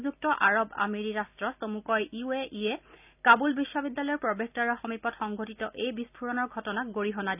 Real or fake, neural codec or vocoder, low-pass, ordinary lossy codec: real; none; 3.6 kHz; none